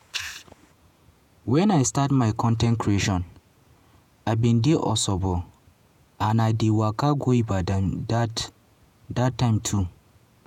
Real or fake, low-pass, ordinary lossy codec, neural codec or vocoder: fake; 19.8 kHz; none; vocoder, 48 kHz, 128 mel bands, Vocos